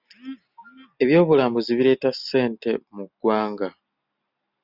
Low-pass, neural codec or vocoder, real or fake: 5.4 kHz; none; real